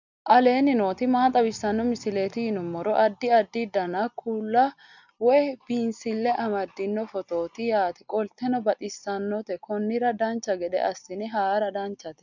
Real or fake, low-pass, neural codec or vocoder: real; 7.2 kHz; none